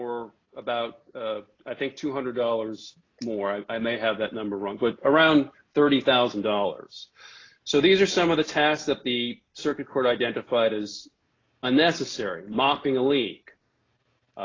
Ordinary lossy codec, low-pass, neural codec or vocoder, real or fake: AAC, 32 kbps; 7.2 kHz; none; real